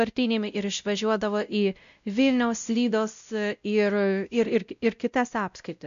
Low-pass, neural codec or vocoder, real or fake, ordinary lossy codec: 7.2 kHz; codec, 16 kHz, 0.5 kbps, X-Codec, WavLM features, trained on Multilingual LibriSpeech; fake; AAC, 96 kbps